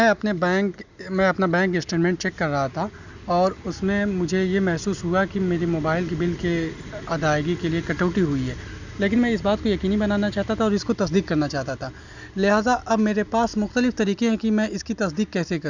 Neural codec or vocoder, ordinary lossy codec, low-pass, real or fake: none; none; 7.2 kHz; real